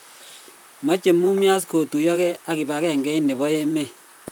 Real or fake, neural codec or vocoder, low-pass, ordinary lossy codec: fake; vocoder, 44.1 kHz, 128 mel bands, Pupu-Vocoder; none; none